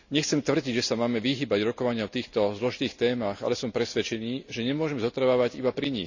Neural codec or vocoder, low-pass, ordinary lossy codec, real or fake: none; 7.2 kHz; none; real